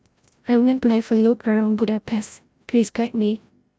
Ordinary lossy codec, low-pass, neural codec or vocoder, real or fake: none; none; codec, 16 kHz, 0.5 kbps, FreqCodec, larger model; fake